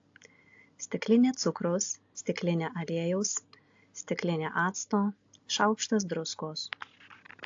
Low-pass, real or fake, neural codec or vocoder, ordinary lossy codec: 7.2 kHz; real; none; AAC, 48 kbps